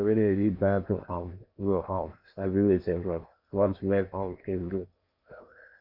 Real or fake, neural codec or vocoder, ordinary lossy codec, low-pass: fake; codec, 16 kHz in and 24 kHz out, 0.8 kbps, FocalCodec, streaming, 65536 codes; none; 5.4 kHz